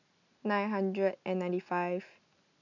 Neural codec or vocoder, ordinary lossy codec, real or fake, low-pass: none; none; real; 7.2 kHz